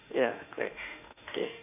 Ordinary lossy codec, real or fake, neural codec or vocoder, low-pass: none; fake; autoencoder, 48 kHz, 32 numbers a frame, DAC-VAE, trained on Japanese speech; 3.6 kHz